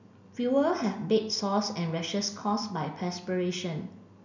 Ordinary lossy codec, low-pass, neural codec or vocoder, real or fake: none; 7.2 kHz; none; real